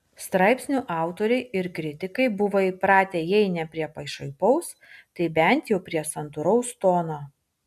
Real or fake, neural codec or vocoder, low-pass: real; none; 14.4 kHz